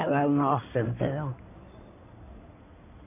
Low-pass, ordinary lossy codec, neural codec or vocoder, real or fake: 3.6 kHz; none; codec, 16 kHz in and 24 kHz out, 2.2 kbps, FireRedTTS-2 codec; fake